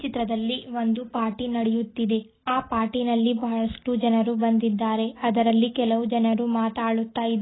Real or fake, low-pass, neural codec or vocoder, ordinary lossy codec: real; 7.2 kHz; none; AAC, 16 kbps